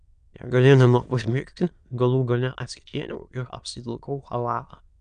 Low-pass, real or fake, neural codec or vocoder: 9.9 kHz; fake; autoencoder, 22.05 kHz, a latent of 192 numbers a frame, VITS, trained on many speakers